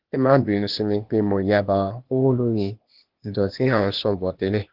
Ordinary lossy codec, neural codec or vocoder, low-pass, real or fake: Opus, 16 kbps; codec, 16 kHz, 0.8 kbps, ZipCodec; 5.4 kHz; fake